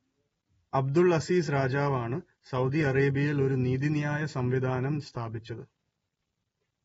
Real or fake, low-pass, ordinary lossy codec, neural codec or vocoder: real; 10.8 kHz; AAC, 24 kbps; none